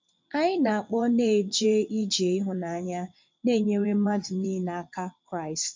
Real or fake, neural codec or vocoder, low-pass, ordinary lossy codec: fake; vocoder, 22.05 kHz, 80 mel bands, WaveNeXt; 7.2 kHz; MP3, 64 kbps